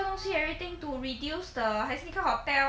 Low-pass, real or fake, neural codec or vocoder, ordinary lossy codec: none; real; none; none